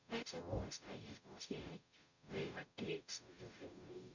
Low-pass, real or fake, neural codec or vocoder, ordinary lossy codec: 7.2 kHz; fake; codec, 44.1 kHz, 0.9 kbps, DAC; none